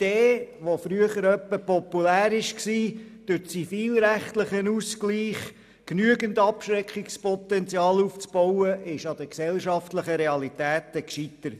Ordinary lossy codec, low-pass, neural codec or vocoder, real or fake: none; 14.4 kHz; none; real